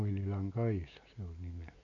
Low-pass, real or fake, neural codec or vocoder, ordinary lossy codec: 7.2 kHz; real; none; none